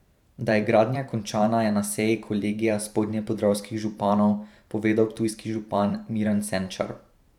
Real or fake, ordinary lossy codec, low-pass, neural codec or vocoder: fake; none; 19.8 kHz; vocoder, 44.1 kHz, 128 mel bands every 512 samples, BigVGAN v2